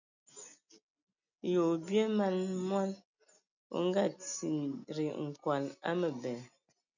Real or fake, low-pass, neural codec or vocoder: real; 7.2 kHz; none